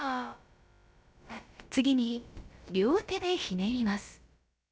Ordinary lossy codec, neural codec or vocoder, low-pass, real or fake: none; codec, 16 kHz, about 1 kbps, DyCAST, with the encoder's durations; none; fake